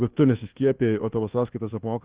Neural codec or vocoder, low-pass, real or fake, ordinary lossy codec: codec, 24 kHz, 1.2 kbps, DualCodec; 3.6 kHz; fake; Opus, 16 kbps